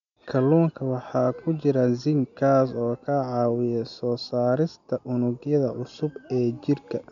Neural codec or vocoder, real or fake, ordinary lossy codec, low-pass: none; real; none; 7.2 kHz